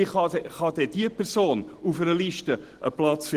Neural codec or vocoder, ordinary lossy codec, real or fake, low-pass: none; Opus, 16 kbps; real; 14.4 kHz